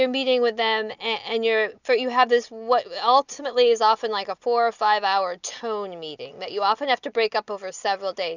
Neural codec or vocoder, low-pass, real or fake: none; 7.2 kHz; real